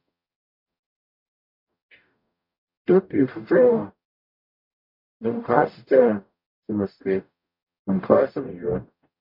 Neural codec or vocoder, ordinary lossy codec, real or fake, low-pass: codec, 44.1 kHz, 0.9 kbps, DAC; MP3, 48 kbps; fake; 5.4 kHz